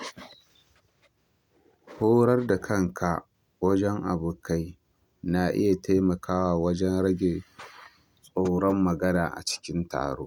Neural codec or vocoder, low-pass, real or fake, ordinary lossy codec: none; 19.8 kHz; real; MP3, 96 kbps